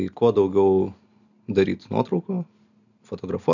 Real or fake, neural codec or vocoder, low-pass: real; none; 7.2 kHz